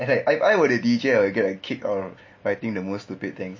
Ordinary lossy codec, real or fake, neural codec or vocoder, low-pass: MP3, 32 kbps; real; none; 7.2 kHz